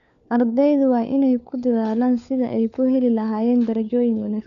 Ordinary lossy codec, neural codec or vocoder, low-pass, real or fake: none; codec, 16 kHz, 4 kbps, FunCodec, trained on LibriTTS, 50 frames a second; 7.2 kHz; fake